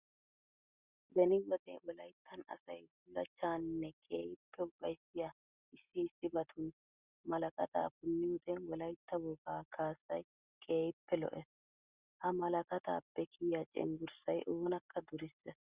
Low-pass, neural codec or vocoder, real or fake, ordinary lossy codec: 3.6 kHz; none; real; Opus, 64 kbps